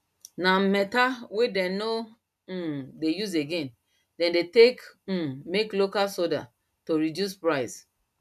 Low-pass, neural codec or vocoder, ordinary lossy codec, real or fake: 14.4 kHz; none; none; real